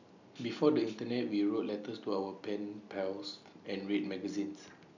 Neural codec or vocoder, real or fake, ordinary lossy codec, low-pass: none; real; none; 7.2 kHz